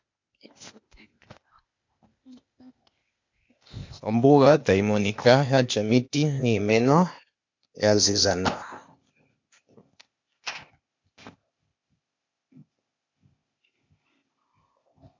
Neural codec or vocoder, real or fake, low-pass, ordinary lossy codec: codec, 16 kHz, 0.8 kbps, ZipCodec; fake; 7.2 kHz; MP3, 48 kbps